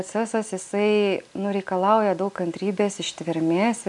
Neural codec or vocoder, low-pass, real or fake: none; 10.8 kHz; real